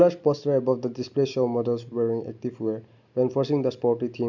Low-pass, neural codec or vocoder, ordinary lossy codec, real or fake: 7.2 kHz; none; none; real